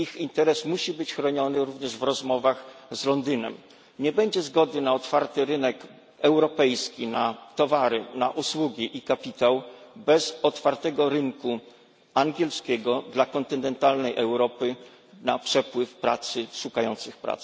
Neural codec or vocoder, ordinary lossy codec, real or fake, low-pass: none; none; real; none